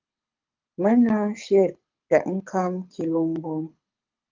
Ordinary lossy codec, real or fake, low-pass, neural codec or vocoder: Opus, 32 kbps; fake; 7.2 kHz; codec, 24 kHz, 6 kbps, HILCodec